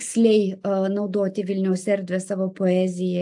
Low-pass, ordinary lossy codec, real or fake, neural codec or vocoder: 10.8 kHz; AAC, 64 kbps; real; none